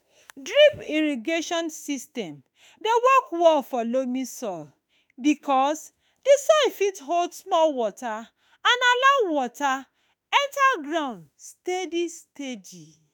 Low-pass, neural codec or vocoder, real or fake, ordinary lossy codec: none; autoencoder, 48 kHz, 32 numbers a frame, DAC-VAE, trained on Japanese speech; fake; none